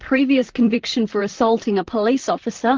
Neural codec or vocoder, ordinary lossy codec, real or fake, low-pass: vocoder, 44.1 kHz, 128 mel bands, Pupu-Vocoder; Opus, 16 kbps; fake; 7.2 kHz